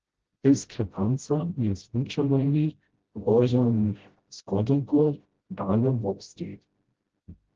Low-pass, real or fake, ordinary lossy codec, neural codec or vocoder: 7.2 kHz; fake; Opus, 16 kbps; codec, 16 kHz, 0.5 kbps, FreqCodec, smaller model